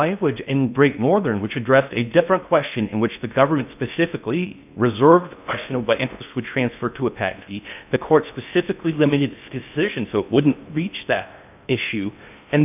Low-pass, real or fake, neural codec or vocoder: 3.6 kHz; fake; codec, 16 kHz in and 24 kHz out, 0.6 kbps, FocalCodec, streaming, 2048 codes